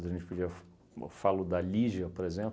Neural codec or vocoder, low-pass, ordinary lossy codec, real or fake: none; none; none; real